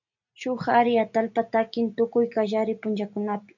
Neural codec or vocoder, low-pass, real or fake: none; 7.2 kHz; real